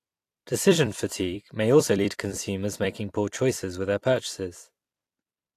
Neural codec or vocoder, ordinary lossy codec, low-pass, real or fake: vocoder, 44.1 kHz, 128 mel bands every 256 samples, BigVGAN v2; AAC, 64 kbps; 14.4 kHz; fake